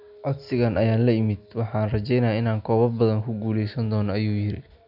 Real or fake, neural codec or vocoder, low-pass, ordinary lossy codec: real; none; 5.4 kHz; none